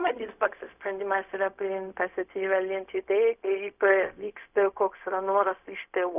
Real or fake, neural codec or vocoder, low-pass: fake; codec, 16 kHz, 0.4 kbps, LongCat-Audio-Codec; 3.6 kHz